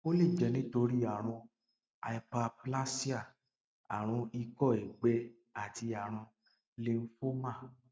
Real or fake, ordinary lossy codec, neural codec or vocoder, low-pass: real; none; none; none